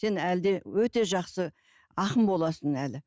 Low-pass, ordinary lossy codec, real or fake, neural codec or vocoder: none; none; real; none